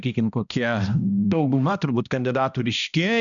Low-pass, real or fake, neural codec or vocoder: 7.2 kHz; fake; codec, 16 kHz, 1 kbps, X-Codec, HuBERT features, trained on balanced general audio